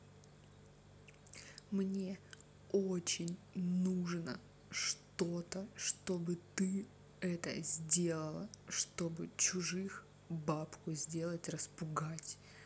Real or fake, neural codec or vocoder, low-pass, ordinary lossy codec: real; none; none; none